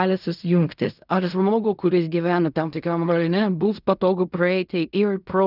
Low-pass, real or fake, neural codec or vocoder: 5.4 kHz; fake; codec, 16 kHz in and 24 kHz out, 0.4 kbps, LongCat-Audio-Codec, fine tuned four codebook decoder